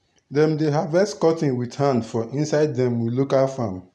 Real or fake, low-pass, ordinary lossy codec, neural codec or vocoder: real; none; none; none